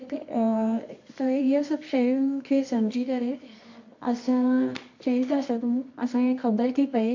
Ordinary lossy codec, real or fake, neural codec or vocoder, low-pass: MP3, 48 kbps; fake; codec, 24 kHz, 0.9 kbps, WavTokenizer, medium music audio release; 7.2 kHz